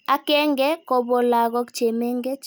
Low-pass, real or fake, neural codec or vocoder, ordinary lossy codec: none; real; none; none